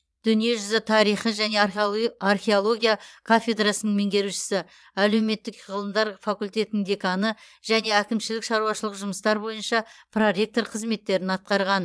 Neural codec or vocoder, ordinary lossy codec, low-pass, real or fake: vocoder, 22.05 kHz, 80 mel bands, Vocos; none; none; fake